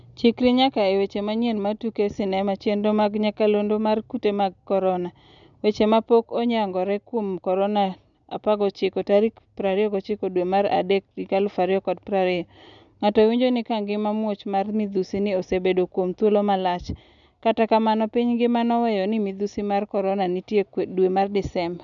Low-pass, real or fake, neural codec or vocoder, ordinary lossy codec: 7.2 kHz; real; none; none